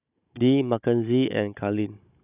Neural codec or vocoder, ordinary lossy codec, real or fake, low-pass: codec, 16 kHz, 16 kbps, FunCodec, trained on Chinese and English, 50 frames a second; none; fake; 3.6 kHz